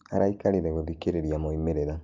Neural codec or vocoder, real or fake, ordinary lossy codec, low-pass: none; real; Opus, 24 kbps; 7.2 kHz